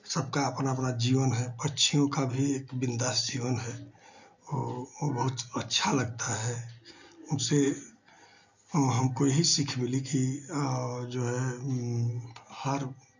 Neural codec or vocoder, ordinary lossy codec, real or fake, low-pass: none; none; real; 7.2 kHz